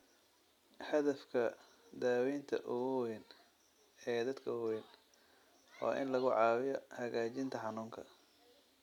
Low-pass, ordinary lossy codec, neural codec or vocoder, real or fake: 19.8 kHz; none; none; real